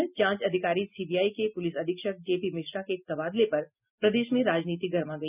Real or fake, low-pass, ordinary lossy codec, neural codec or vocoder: real; 3.6 kHz; none; none